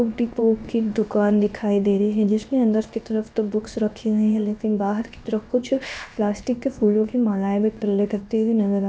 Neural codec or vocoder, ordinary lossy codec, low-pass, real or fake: codec, 16 kHz, 0.7 kbps, FocalCodec; none; none; fake